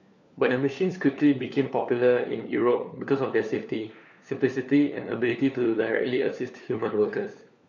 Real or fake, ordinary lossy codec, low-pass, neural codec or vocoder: fake; none; 7.2 kHz; codec, 16 kHz, 4 kbps, FunCodec, trained on LibriTTS, 50 frames a second